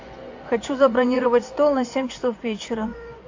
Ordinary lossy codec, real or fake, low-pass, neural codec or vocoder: AAC, 48 kbps; fake; 7.2 kHz; vocoder, 24 kHz, 100 mel bands, Vocos